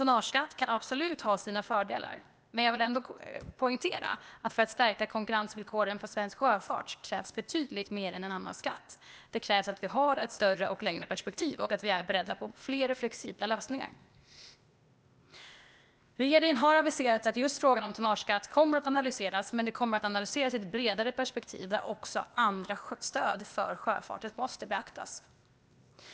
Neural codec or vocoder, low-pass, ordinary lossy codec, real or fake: codec, 16 kHz, 0.8 kbps, ZipCodec; none; none; fake